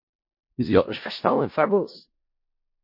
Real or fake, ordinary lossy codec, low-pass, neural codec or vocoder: fake; MP3, 32 kbps; 5.4 kHz; codec, 16 kHz in and 24 kHz out, 0.4 kbps, LongCat-Audio-Codec, four codebook decoder